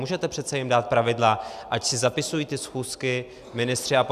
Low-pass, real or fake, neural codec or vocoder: 14.4 kHz; real; none